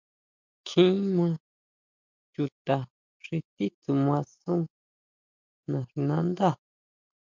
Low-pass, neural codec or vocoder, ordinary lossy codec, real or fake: 7.2 kHz; none; MP3, 64 kbps; real